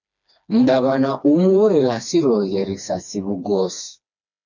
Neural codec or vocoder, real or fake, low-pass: codec, 16 kHz, 2 kbps, FreqCodec, smaller model; fake; 7.2 kHz